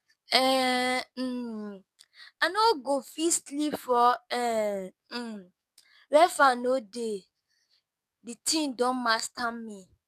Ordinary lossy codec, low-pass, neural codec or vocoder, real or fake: none; 14.4 kHz; codec, 44.1 kHz, 7.8 kbps, DAC; fake